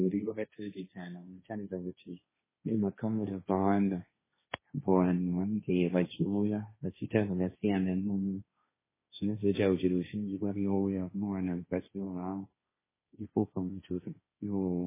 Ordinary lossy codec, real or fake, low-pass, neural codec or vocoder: MP3, 16 kbps; fake; 3.6 kHz; codec, 16 kHz, 1.1 kbps, Voila-Tokenizer